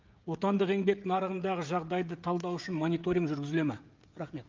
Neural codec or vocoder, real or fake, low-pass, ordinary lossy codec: codec, 16 kHz, 16 kbps, FreqCodec, smaller model; fake; 7.2 kHz; Opus, 24 kbps